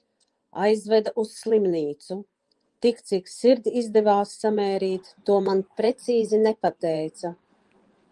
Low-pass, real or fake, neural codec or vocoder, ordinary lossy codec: 10.8 kHz; fake; vocoder, 24 kHz, 100 mel bands, Vocos; Opus, 24 kbps